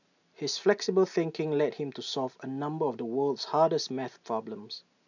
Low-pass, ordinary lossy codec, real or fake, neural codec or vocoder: 7.2 kHz; none; real; none